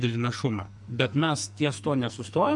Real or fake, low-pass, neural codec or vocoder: fake; 10.8 kHz; codec, 44.1 kHz, 2.6 kbps, SNAC